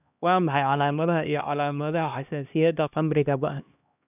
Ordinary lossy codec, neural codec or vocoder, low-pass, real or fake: none; codec, 16 kHz, 1 kbps, X-Codec, HuBERT features, trained on LibriSpeech; 3.6 kHz; fake